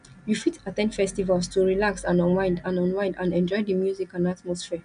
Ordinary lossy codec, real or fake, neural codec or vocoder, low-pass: none; real; none; 9.9 kHz